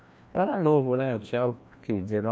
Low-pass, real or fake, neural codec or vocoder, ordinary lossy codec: none; fake; codec, 16 kHz, 1 kbps, FreqCodec, larger model; none